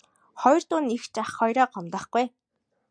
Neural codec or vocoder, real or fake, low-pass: none; real; 9.9 kHz